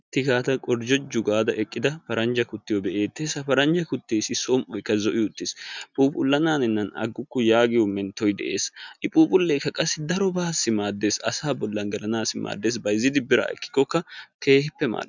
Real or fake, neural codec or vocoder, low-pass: real; none; 7.2 kHz